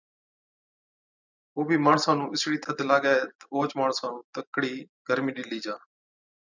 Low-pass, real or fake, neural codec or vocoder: 7.2 kHz; real; none